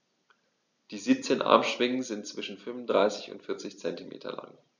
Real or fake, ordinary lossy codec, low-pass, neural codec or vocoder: real; none; 7.2 kHz; none